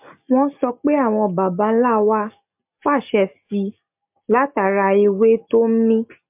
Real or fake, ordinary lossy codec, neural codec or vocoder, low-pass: real; MP3, 32 kbps; none; 3.6 kHz